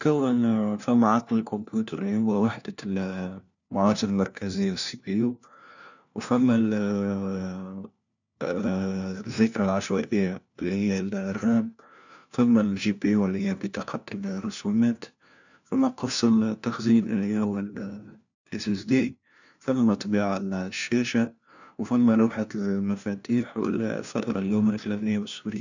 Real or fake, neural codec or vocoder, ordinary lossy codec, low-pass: fake; codec, 16 kHz, 1 kbps, FunCodec, trained on LibriTTS, 50 frames a second; none; 7.2 kHz